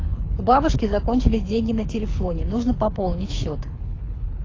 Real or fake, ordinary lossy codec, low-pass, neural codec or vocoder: fake; AAC, 32 kbps; 7.2 kHz; codec, 24 kHz, 6 kbps, HILCodec